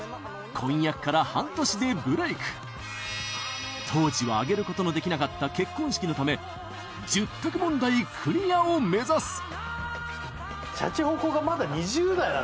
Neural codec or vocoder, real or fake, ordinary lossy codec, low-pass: none; real; none; none